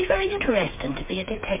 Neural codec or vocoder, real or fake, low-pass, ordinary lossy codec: codec, 16 kHz, 8 kbps, FreqCodec, smaller model; fake; 3.6 kHz; MP3, 32 kbps